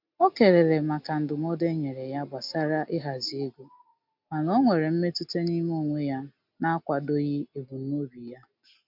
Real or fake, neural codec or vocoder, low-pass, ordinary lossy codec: real; none; 5.4 kHz; MP3, 48 kbps